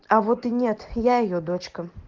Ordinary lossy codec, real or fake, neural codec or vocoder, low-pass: Opus, 16 kbps; real; none; 7.2 kHz